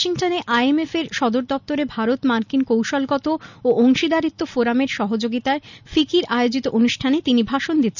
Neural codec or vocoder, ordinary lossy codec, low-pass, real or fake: none; none; 7.2 kHz; real